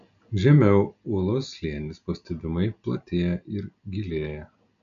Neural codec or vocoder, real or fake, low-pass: none; real; 7.2 kHz